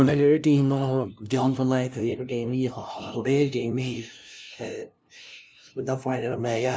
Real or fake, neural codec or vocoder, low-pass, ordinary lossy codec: fake; codec, 16 kHz, 0.5 kbps, FunCodec, trained on LibriTTS, 25 frames a second; none; none